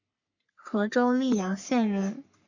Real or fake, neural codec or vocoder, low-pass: fake; codec, 44.1 kHz, 3.4 kbps, Pupu-Codec; 7.2 kHz